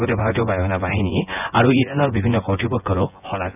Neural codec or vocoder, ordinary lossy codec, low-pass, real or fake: vocoder, 24 kHz, 100 mel bands, Vocos; none; 3.6 kHz; fake